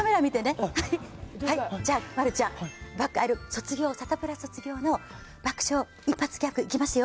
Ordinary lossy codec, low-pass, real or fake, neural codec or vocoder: none; none; real; none